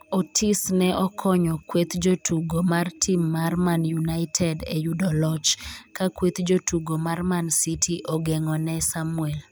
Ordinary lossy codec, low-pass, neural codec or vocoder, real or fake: none; none; none; real